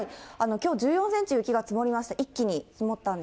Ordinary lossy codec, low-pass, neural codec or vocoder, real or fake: none; none; none; real